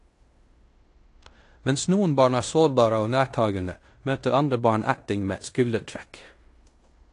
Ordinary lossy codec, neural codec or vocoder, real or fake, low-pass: AAC, 48 kbps; codec, 16 kHz in and 24 kHz out, 0.9 kbps, LongCat-Audio-Codec, fine tuned four codebook decoder; fake; 10.8 kHz